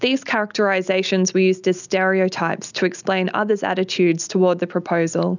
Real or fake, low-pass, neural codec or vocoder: real; 7.2 kHz; none